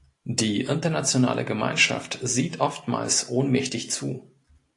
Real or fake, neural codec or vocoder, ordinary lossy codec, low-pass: real; none; AAC, 48 kbps; 10.8 kHz